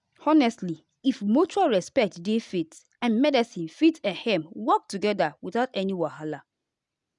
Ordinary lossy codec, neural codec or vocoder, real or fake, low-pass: none; none; real; 10.8 kHz